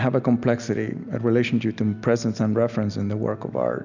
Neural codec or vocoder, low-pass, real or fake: none; 7.2 kHz; real